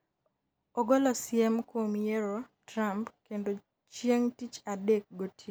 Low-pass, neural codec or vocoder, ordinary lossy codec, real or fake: none; none; none; real